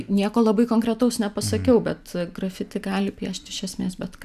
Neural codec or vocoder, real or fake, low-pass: none; real; 14.4 kHz